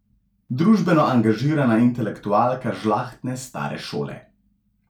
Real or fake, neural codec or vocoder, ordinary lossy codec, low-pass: real; none; none; 19.8 kHz